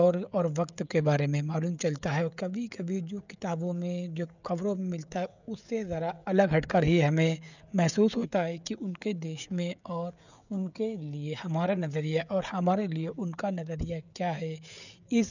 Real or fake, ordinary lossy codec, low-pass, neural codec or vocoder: fake; none; 7.2 kHz; codec, 16 kHz, 16 kbps, FreqCodec, smaller model